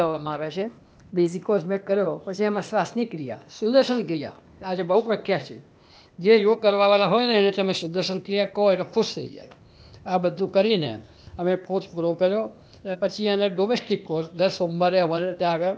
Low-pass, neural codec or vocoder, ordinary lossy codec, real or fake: none; codec, 16 kHz, 0.8 kbps, ZipCodec; none; fake